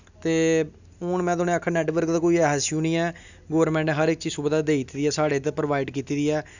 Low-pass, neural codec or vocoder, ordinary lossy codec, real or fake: 7.2 kHz; none; none; real